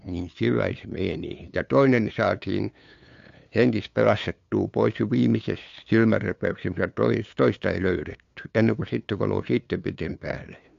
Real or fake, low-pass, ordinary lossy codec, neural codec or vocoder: fake; 7.2 kHz; AAC, 64 kbps; codec, 16 kHz, 2 kbps, FunCodec, trained on LibriTTS, 25 frames a second